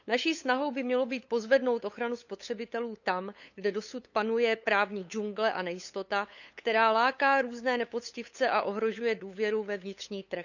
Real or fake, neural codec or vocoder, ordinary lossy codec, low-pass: fake; codec, 16 kHz, 8 kbps, FunCodec, trained on LibriTTS, 25 frames a second; none; 7.2 kHz